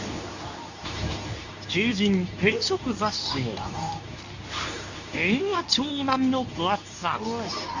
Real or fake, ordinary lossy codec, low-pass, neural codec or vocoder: fake; none; 7.2 kHz; codec, 24 kHz, 0.9 kbps, WavTokenizer, medium speech release version 2